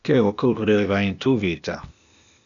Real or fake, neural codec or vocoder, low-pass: fake; codec, 16 kHz, 0.8 kbps, ZipCodec; 7.2 kHz